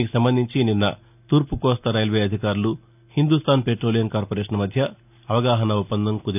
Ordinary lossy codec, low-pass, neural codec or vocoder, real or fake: none; 3.6 kHz; none; real